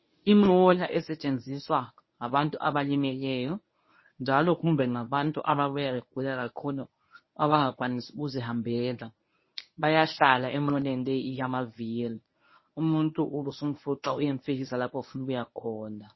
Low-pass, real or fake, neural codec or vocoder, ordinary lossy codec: 7.2 kHz; fake; codec, 24 kHz, 0.9 kbps, WavTokenizer, medium speech release version 1; MP3, 24 kbps